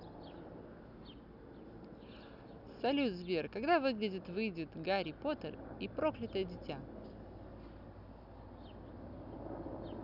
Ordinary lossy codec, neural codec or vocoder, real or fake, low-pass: none; none; real; 5.4 kHz